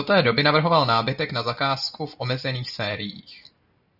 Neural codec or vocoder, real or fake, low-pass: none; real; 5.4 kHz